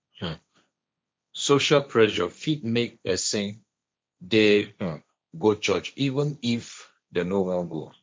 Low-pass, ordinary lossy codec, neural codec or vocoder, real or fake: none; none; codec, 16 kHz, 1.1 kbps, Voila-Tokenizer; fake